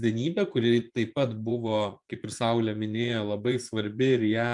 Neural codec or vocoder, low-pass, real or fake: vocoder, 24 kHz, 100 mel bands, Vocos; 10.8 kHz; fake